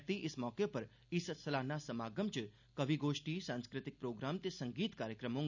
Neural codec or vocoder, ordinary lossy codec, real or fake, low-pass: none; none; real; 7.2 kHz